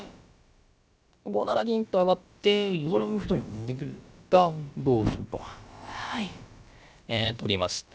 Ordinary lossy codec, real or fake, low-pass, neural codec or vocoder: none; fake; none; codec, 16 kHz, about 1 kbps, DyCAST, with the encoder's durations